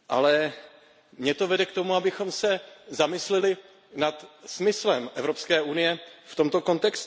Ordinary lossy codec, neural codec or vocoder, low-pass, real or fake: none; none; none; real